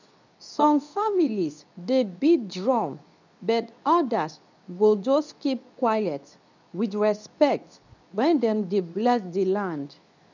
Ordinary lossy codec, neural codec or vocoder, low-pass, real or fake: none; codec, 24 kHz, 0.9 kbps, WavTokenizer, medium speech release version 2; 7.2 kHz; fake